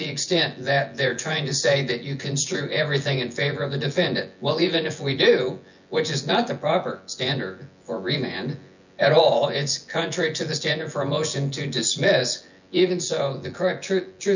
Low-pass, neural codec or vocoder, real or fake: 7.2 kHz; vocoder, 24 kHz, 100 mel bands, Vocos; fake